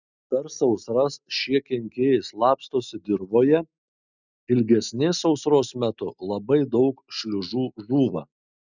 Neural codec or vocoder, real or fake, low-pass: none; real; 7.2 kHz